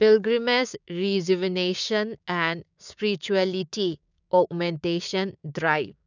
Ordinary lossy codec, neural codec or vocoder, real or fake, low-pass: none; codec, 16 kHz, 6 kbps, DAC; fake; 7.2 kHz